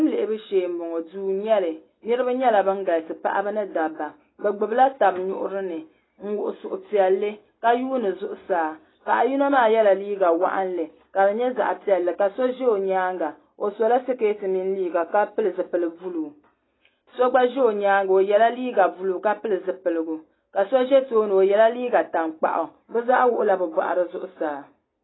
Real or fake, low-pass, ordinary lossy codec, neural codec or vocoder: real; 7.2 kHz; AAC, 16 kbps; none